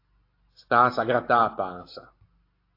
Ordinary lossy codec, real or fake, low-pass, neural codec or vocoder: AAC, 32 kbps; real; 5.4 kHz; none